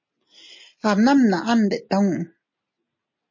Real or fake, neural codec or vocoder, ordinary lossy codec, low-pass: real; none; MP3, 32 kbps; 7.2 kHz